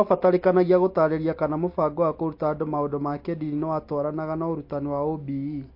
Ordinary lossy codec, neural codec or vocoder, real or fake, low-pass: MP3, 32 kbps; none; real; 5.4 kHz